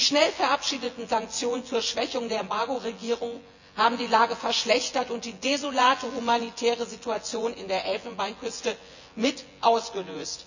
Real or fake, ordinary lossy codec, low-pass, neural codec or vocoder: fake; none; 7.2 kHz; vocoder, 24 kHz, 100 mel bands, Vocos